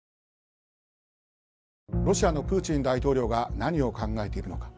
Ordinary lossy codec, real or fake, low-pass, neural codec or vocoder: none; real; none; none